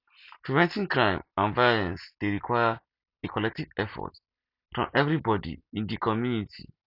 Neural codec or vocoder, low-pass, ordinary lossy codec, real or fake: none; 5.4 kHz; none; real